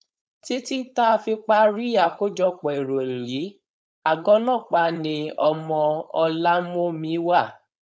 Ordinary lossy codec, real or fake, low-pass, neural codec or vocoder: none; fake; none; codec, 16 kHz, 4.8 kbps, FACodec